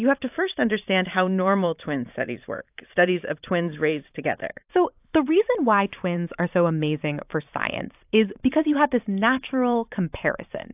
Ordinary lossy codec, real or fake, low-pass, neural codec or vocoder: AAC, 32 kbps; fake; 3.6 kHz; vocoder, 44.1 kHz, 128 mel bands every 512 samples, BigVGAN v2